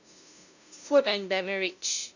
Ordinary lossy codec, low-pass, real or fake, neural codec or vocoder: none; 7.2 kHz; fake; codec, 16 kHz, 0.5 kbps, FunCodec, trained on LibriTTS, 25 frames a second